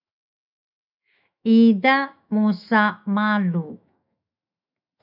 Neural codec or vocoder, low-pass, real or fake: autoencoder, 48 kHz, 128 numbers a frame, DAC-VAE, trained on Japanese speech; 5.4 kHz; fake